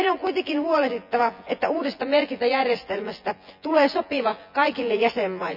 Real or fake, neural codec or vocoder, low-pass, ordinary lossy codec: fake; vocoder, 24 kHz, 100 mel bands, Vocos; 5.4 kHz; none